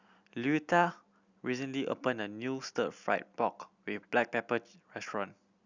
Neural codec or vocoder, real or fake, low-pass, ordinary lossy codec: none; real; 7.2 kHz; Opus, 64 kbps